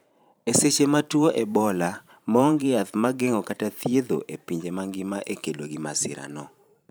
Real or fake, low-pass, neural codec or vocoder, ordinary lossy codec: fake; none; vocoder, 44.1 kHz, 128 mel bands every 512 samples, BigVGAN v2; none